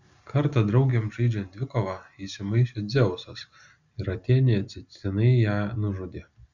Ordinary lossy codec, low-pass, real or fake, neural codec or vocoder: Opus, 64 kbps; 7.2 kHz; real; none